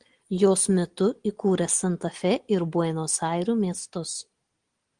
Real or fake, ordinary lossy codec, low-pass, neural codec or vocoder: real; Opus, 24 kbps; 9.9 kHz; none